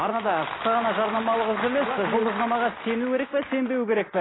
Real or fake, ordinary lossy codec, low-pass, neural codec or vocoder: real; AAC, 16 kbps; 7.2 kHz; none